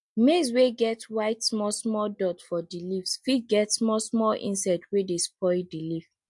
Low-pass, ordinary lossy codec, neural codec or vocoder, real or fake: 10.8 kHz; MP3, 64 kbps; none; real